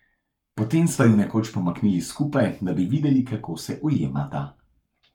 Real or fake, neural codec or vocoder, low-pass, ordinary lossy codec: fake; codec, 44.1 kHz, 7.8 kbps, Pupu-Codec; 19.8 kHz; none